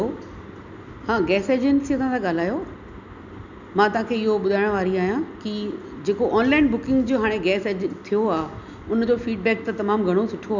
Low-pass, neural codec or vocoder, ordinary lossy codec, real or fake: 7.2 kHz; none; none; real